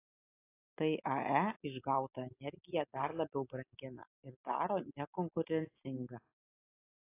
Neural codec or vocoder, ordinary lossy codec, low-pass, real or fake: none; AAC, 24 kbps; 3.6 kHz; real